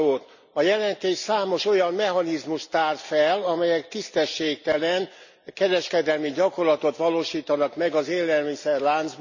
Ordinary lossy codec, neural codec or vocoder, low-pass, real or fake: none; none; 7.2 kHz; real